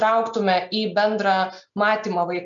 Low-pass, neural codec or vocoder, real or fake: 7.2 kHz; none; real